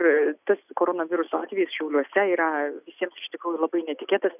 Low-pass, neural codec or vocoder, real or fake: 3.6 kHz; none; real